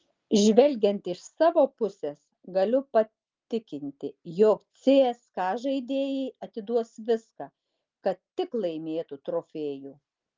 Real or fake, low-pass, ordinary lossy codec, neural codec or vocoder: real; 7.2 kHz; Opus, 32 kbps; none